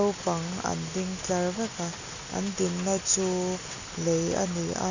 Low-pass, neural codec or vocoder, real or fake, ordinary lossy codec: 7.2 kHz; none; real; none